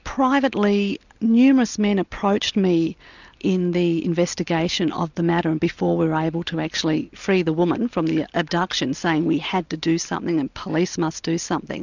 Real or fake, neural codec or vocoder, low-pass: real; none; 7.2 kHz